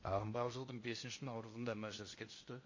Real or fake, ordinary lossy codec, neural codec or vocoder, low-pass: fake; MP3, 32 kbps; codec, 16 kHz in and 24 kHz out, 0.8 kbps, FocalCodec, streaming, 65536 codes; 7.2 kHz